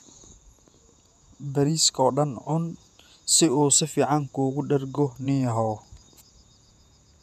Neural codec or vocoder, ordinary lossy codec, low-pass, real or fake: vocoder, 48 kHz, 128 mel bands, Vocos; none; 14.4 kHz; fake